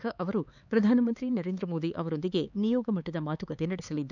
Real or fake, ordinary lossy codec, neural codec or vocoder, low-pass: fake; none; codec, 16 kHz, 4 kbps, X-Codec, HuBERT features, trained on LibriSpeech; 7.2 kHz